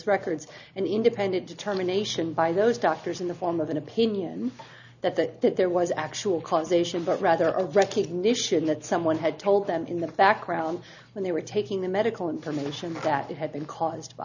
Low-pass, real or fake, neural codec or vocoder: 7.2 kHz; real; none